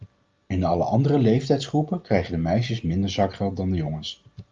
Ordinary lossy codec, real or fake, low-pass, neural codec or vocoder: Opus, 32 kbps; real; 7.2 kHz; none